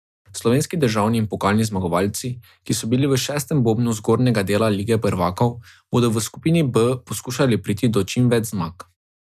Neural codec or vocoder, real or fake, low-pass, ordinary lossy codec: none; real; 14.4 kHz; none